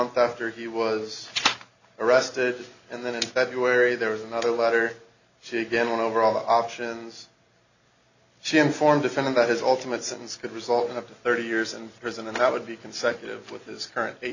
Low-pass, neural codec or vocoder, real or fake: 7.2 kHz; none; real